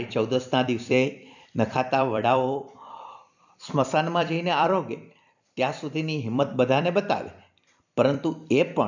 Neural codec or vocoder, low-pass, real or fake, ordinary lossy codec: vocoder, 44.1 kHz, 128 mel bands every 256 samples, BigVGAN v2; 7.2 kHz; fake; none